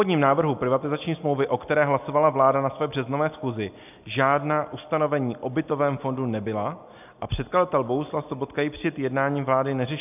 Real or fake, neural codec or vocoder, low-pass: real; none; 3.6 kHz